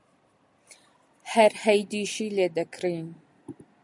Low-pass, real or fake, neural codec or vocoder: 10.8 kHz; real; none